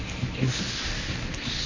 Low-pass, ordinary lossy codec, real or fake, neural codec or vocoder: 7.2 kHz; MP3, 32 kbps; fake; codec, 24 kHz, 0.9 kbps, WavTokenizer, medium speech release version 1